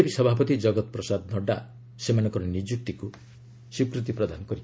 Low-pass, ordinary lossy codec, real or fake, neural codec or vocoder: none; none; real; none